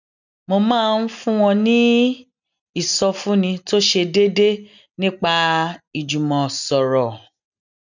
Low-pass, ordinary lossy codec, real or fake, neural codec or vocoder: 7.2 kHz; none; real; none